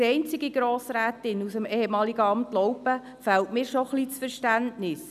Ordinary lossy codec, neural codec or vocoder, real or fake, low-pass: none; none; real; 14.4 kHz